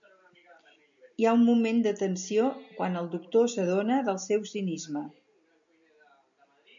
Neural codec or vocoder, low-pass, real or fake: none; 7.2 kHz; real